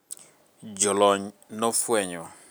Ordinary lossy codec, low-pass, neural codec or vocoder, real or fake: none; none; none; real